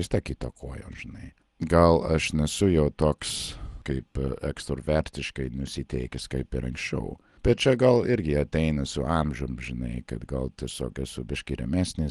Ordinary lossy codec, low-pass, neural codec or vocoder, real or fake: Opus, 24 kbps; 10.8 kHz; none; real